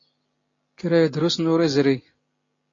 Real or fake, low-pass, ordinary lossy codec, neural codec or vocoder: real; 7.2 kHz; AAC, 32 kbps; none